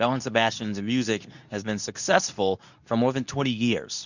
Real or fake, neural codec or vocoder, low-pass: fake; codec, 24 kHz, 0.9 kbps, WavTokenizer, medium speech release version 2; 7.2 kHz